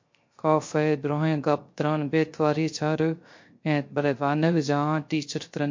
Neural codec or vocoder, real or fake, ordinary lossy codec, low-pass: codec, 16 kHz, 0.3 kbps, FocalCodec; fake; MP3, 48 kbps; 7.2 kHz